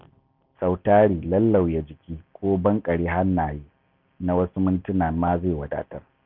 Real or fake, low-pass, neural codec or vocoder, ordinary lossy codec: real; 5.4 kHz; none; none